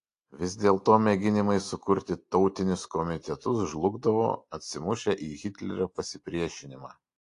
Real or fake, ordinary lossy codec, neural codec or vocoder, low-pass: real; AAC, 48 kbps; none; 10.8 kHz